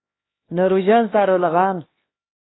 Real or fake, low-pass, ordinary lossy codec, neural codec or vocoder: fake; 7.2 kHz; AAC, 16 kbps; codec, 16 kHz, 2 kbps, X-Codec, HuBERT features, trained on LibriSpeech